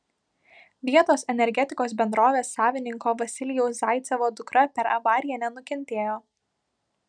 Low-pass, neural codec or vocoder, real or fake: 9.9 kHz; none; real